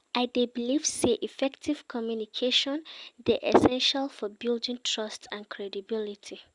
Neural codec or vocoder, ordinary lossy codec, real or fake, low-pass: none; Opus, 64 kbps; real; 10.8 kHz